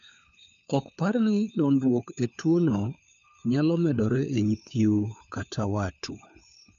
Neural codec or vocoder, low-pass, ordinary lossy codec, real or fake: codec, 16 kHz, 4 kbps, FunCodec, trained on LibriTTS, 50 frames a second; 7.2 kHz; none; fake